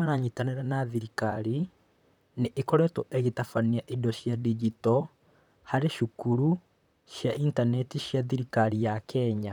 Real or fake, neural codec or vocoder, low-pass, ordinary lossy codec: fake; vocoder, 44.1 kHz, 128 mel bands, Pupu-Vocoder; 19.8 kHz; none